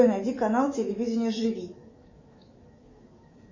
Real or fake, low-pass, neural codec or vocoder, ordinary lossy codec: fake; 7.2 kHz; autoencoder, 48 kHz, 128 numbers a frame, DAC-VAE, trained on Japanese speech; MP3, 32 kbps